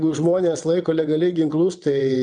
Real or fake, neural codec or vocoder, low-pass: fake; vocoder, 22.05 kHz, 80 mel bands, WaveNeXt; 9.9 kHz